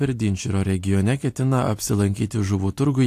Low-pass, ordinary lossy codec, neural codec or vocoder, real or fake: 14.4 kHz; AAC, 64 kbps; none; real